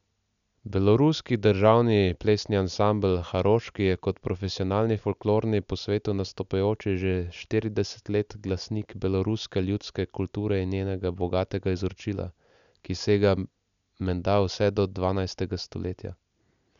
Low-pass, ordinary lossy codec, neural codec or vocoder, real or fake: 7.2 kHz; none; none; real